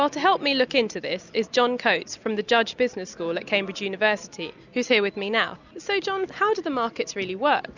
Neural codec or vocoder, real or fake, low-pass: none; real; 7.2 kHz